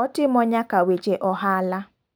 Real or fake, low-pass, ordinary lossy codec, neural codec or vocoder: real; none; none; none